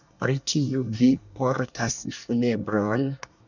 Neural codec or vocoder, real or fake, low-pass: codec, 24 kHz, 1 kbps, SNAC; fake; 7.2 kHz